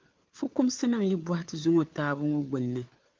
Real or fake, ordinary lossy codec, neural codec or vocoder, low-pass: fake; Opus, 24 kbps; codec, 16 kHz, 4 kbps, FunCodec, trained on LibriTTS, 50 frames a second; 7.2 kHz